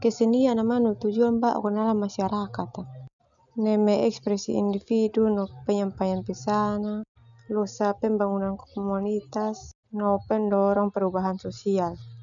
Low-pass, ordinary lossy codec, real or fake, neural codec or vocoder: 7.2 kHz; none; real; none